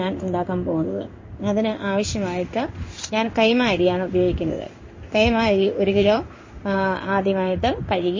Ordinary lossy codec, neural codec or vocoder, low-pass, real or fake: MP3, 32 kbps; codec, 16 kHz in and 24 kHz out, 1 kbps, XY-Tokenizer; 7.2 kHz; fake